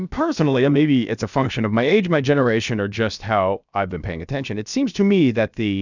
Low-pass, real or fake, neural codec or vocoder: 7.2 kHz; fake; codec, 16 kHz, about 1 kbps, DyCAST, with the encoder's durations